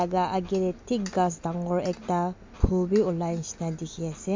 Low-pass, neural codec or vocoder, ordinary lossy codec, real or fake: 7.2 kHz; none; MP3, 48 kbps; real